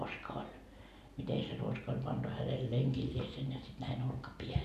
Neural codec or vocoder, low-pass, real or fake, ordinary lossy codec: none; 14.4 kHz; real; none